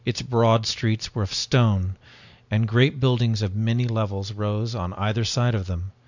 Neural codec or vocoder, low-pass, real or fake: none; 7.2 kHz; real